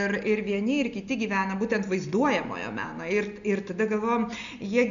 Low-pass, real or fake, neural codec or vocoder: 7.2 kHz; real; none